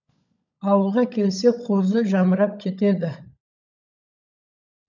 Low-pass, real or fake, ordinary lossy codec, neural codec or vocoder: 7.2 kHz; fake; none; codec, 16 kHz, 16 kbps, FunCodec, trained on LibriTTS, 50 frames a second